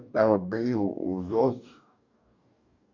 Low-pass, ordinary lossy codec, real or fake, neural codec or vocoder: 7.2 kHz; none; fake; codec, 44.1 kHz, 2.6 kbps, DAC